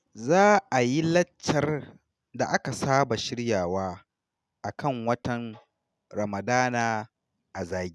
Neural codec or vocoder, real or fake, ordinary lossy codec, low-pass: none; real; none; none